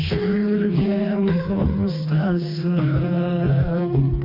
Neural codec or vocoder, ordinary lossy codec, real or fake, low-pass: codec, 16 kHz, 2 kbps, FreqCodec, smaller model; MP3, 32 kbps; fake; 5.4 kHz